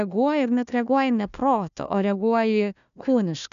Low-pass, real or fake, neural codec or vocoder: 7.2 kHz; fake; codec, 16 kHz, 1 kbps, FunCodec, trained on Chinese and English, 50 frames a second